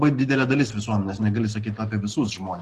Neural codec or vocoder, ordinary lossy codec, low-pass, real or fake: none; Opus, 16 kbps; 14.4 kHz; real